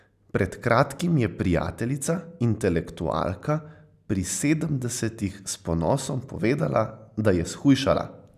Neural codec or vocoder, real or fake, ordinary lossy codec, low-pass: none; real; none; 14.4 kHz